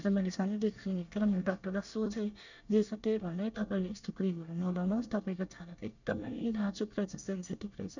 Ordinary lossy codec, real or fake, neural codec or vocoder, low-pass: none; fake; codec, 24 kHz, 1 kbps, SNAC; 7.2 kHz